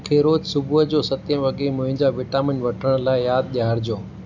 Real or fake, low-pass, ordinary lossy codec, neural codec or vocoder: real; 7.2 kHz; none; none